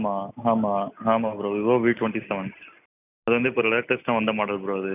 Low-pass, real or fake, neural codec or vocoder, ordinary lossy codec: 3.6 kHz; real; none; none